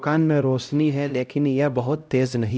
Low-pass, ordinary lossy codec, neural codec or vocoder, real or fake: none; none; codec, 16 kHz, 0.5 kbps, X-Codec, HuBERT features, trained on LibriSpeech; fake